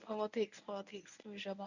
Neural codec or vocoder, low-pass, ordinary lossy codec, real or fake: codec, 24 kHz, 0.9 kbps, WavTokenizer, medium speech release version 1; 7.2 kHz; none; fake